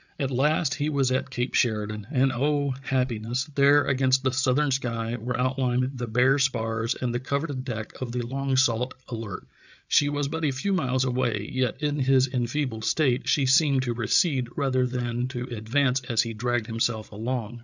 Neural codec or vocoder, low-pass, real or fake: codec, 16 kHz, 8 kbps, FreqCodec, larger model; 7.2 kHz; fake